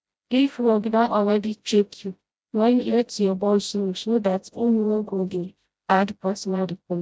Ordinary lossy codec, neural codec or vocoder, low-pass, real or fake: none; codec, 16 kHz, 0.5 kbps, FreqCodec, smaller model; none; fake